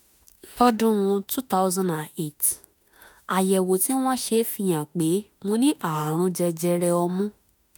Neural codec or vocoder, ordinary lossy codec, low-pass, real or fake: autoencoder, 48 kHz, 32 numbers a frame, DAC-VAE, trained on Japanese speech; none; none; fake